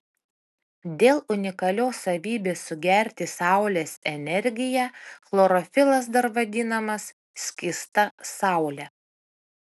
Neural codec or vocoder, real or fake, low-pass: none; real; 14.4 kHz